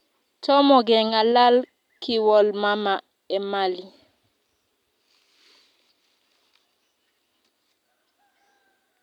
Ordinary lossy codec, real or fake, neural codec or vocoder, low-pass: none; real; none; 19.8 kHz